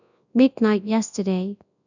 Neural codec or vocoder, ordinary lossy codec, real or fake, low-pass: codec, 24 kHz, 0.9 kbps, WavTokenizer, large speech release; none; fake; 7.2 kHz